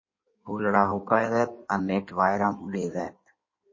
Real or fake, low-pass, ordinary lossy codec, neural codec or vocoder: fake; 7.2 kHz; MP3, 32 kbps; codec, 16 kHz in and 24 kHz out, 1.1 kbps, FireRedTTS-2 codec